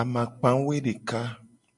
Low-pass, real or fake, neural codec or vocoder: 10.8 kHz; real; none